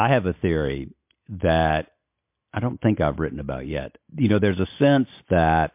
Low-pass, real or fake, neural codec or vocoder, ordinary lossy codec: 3.6 kHz; real; none; MP3, 32 kbps